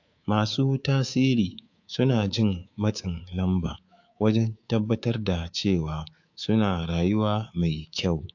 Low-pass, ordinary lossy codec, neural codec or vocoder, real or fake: 7.2 kHz; AAC, 48 kbps; codec, 24 kHz, 3.1 kbps, DualCodec; fake